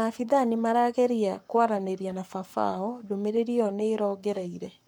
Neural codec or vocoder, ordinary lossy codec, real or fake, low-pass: codec, 44.1 kHz, 7.8 kbps, Pupu-Codec; none; fake; 19.8 kHz